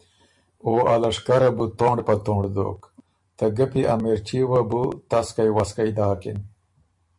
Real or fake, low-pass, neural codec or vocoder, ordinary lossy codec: fake; 10.8 kHz; vocoder, 44.1 kHz, 128 mel bands every 512 samples, BigVGAN v2; MP3, 64 kbps